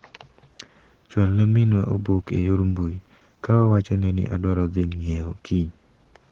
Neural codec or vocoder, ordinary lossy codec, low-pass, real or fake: codec, 44.1 kHz, 7.8 kbps, Pupu-Codec; Opus, 16 kbps; 19.8 kHz; fake